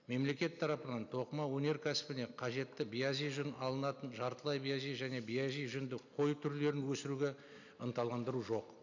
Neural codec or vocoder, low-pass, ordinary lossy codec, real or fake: none; 7.2 kHz; none; real